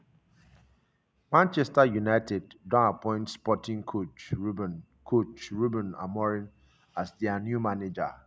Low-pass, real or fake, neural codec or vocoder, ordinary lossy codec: none; real; none; none